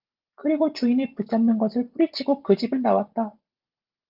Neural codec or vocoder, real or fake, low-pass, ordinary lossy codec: none; real; 5.4 kHz; Opus, 32 kbps